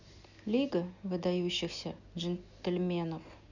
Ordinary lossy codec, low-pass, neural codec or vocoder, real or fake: none; 7.2 kHz; none; real